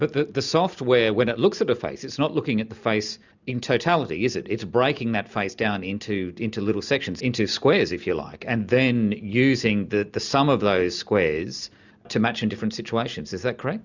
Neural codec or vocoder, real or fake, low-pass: none; real; 7.2 kHz